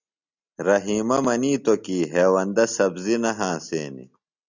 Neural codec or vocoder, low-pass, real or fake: none; 7.2 kHz; real